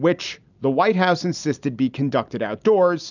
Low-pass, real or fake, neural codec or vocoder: 7.2 kHz; real; none